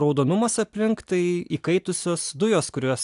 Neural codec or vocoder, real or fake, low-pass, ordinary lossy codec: none; real; 10.8 kHz; AAC, 96 kbps